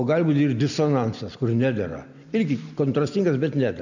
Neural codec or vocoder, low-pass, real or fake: none; 7.2 kHz; real